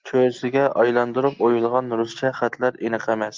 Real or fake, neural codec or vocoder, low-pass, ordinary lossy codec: real; none; 7.2 kHz; Opus, 32 kbps